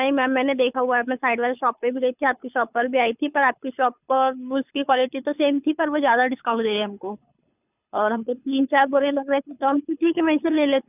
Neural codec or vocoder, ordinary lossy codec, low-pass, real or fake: codec, 24 kHz, 6 kbps, HILCodec; none; 3.6 kHz; fake